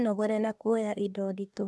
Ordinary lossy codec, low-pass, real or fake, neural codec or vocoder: none; none; fake; codec, 24 kHz, 1 kbps, SNAC